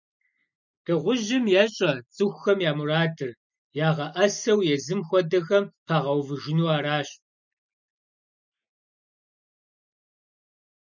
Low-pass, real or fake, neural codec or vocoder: 7.2 kHz; real; none